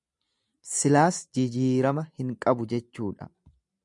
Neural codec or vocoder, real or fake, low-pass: none; real; 10.8 kHz